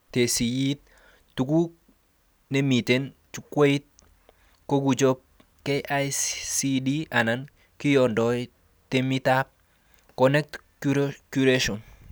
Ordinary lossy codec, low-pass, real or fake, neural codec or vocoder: none; none; real; none